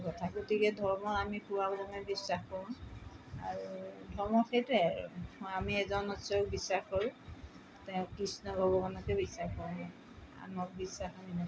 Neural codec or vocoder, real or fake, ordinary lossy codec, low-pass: none; real; none; none